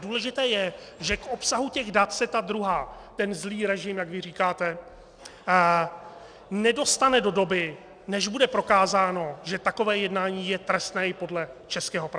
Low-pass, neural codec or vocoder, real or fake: 9.9 kHz; none; real